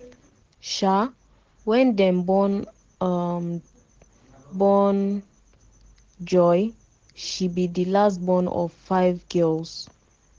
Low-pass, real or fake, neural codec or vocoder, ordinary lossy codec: 7.2 kHz; real; none; Opus, 16 kbps